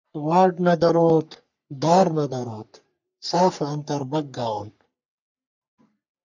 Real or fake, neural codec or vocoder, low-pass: fake; codec, 44.1 kHz, 3.4 kbps, Pupu-Codec; 7.2 kHz